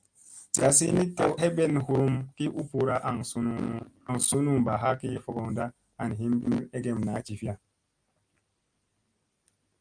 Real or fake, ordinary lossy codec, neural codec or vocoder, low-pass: real; Opus, 32 kbps; none; 9.9 kHz